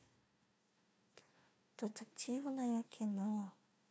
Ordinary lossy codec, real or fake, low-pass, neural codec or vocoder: none; fake; none; codec, 16 kHz, 1 kbps, FunCodec, trained on Chinese and English, 50 frames a second